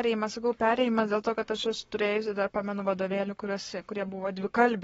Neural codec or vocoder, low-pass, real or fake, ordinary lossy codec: codec, 44.1 kHz, 7.8 kbps, Pupu-Codec; 19.8 kHz; fake; AAC, 24 kbps